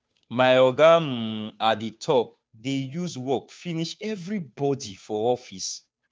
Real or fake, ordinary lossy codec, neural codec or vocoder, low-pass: fake; none; codec, 16 kHz, 2 kbps, FunCodec, trained on Chinese and English, 25 frames a second; none